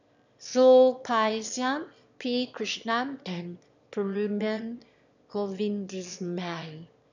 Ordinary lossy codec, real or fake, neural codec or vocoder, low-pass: none; fake; autoencoder, 22.05 kHz, a latent of 192 numbers a frame, VITS, trained on one speaker; 7.2 kHz